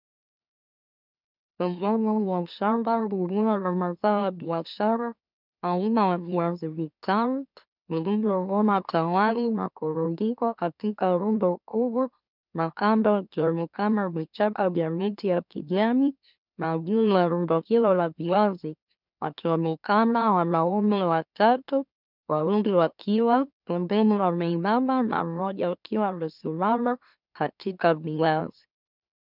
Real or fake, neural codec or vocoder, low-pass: fake; autoencoder, 44.1 kHz, a latent of 192 numbers a frame, MeloTTS; 5.4 kHz